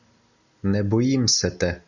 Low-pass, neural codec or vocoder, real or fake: 7.2 kHz; none; real